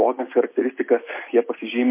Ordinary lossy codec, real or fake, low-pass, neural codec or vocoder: MP3, 24 kbps; real; 3.6 kHz; none